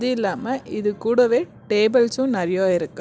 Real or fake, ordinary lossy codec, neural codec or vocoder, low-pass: real; none; none; none